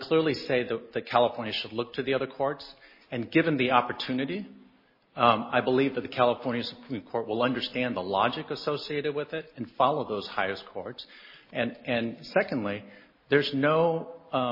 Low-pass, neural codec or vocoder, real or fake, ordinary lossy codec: 5.4 kHz; none; real; MP3, 24 kbps